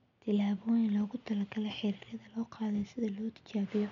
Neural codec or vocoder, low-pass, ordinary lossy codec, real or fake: none; 7.2 kHz; none; real